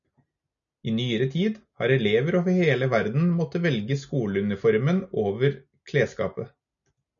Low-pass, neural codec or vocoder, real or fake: 7.2 kHz; none; real